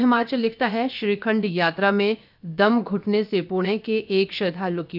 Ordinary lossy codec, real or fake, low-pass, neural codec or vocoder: none; fake; 5.4 kHz; codec, 16 kHz, about 1 kbps, DyCAST, with the encoder's durations